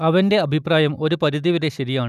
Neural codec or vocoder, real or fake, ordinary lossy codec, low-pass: none; real; none; 14.4 kHz